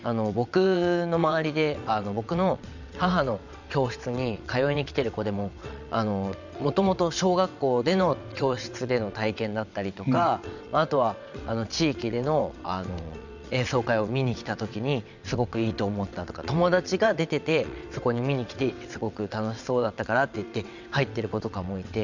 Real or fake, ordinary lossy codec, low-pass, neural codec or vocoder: fake; none; 7.2 kHz; vocoder, 22.05 kHz, 80 mel bands, WaveNeXt